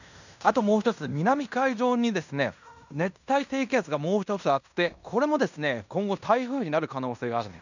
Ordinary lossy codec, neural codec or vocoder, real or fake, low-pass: none; codec, 16 kHz in and 24 kHz out, 0.9 kbps, LongCat-Audio-Codec, fine tuned four codebook decoder; fake; 7.2 kHz